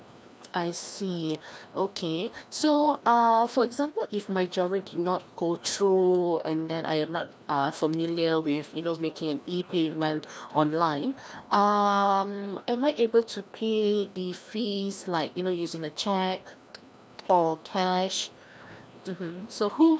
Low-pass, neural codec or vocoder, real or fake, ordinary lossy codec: none; codec, 16 kHz, 1 kbps, FreqCodec, larger model; fake; none